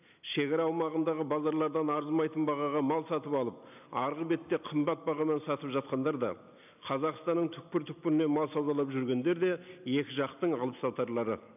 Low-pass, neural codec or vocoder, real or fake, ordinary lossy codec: 3.6 kHz; none; real; none